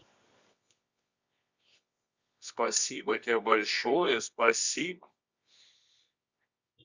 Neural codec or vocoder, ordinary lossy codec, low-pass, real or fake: codec, 24 kHz, 0.9 kbps, WavTokenizer, medium music audio release; Opus, 64 kbps; 7.2 kHz; fake